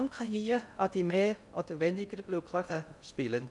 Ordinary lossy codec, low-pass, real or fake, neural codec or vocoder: none; 10.8 kHz; fake; codec, 16 kHz in and 24 kHz out, 0.6 kbps, FocalCodec, streaming, 4096 codes